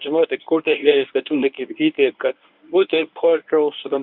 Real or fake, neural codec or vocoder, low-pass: fake; codec, 24 kHz, 0.9 kbps, WavTokenizer, medium speech release version 1; 5.4 kHz